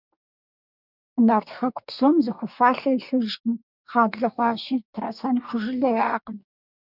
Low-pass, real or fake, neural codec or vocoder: 5.4 kHz; fake; codec, 44.1 kHz, 2.6 kbps, DAC